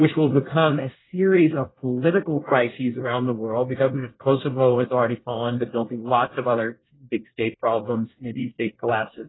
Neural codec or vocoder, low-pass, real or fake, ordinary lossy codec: codec, 24 kHz, 1 kbps, SNAC; 7.2 kHz; fake; AAC, 16 kbps